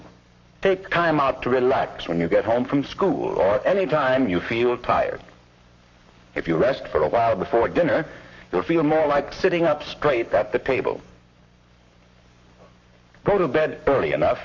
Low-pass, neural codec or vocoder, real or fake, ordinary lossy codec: 7.2 kHz; codec, 44.1 kHz, 7.8 kbps, Pupu-Codec; fake; MP3, 48 kbps